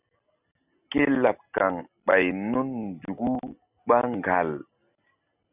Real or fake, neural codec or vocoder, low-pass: real; none; 3.6 kHz